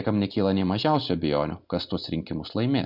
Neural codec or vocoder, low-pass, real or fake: none; 5.4 kHz; real